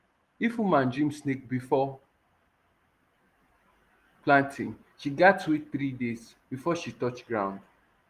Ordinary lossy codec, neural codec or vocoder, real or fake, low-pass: Opus, 24 kbps; none; real; 14.4 kHz